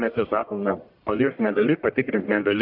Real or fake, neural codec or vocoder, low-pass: fake; codec, 44.1 kHz, 1.7 kbps, Pupu-Codec; 5.4 kHz